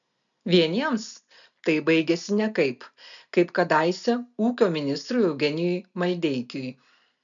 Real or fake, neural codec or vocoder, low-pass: real; none; 7.2 kHz